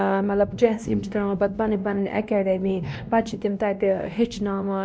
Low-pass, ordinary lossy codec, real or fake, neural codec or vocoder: none; none; fake; codec, 16 kHz, 1 kbps, X-Codec, WavLM features, trained on Multilingual LibriSpeech